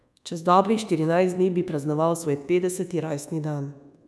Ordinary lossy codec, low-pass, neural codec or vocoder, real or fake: none; none; codec, 24 kHz, 1.2 kbps, DualCodec; fake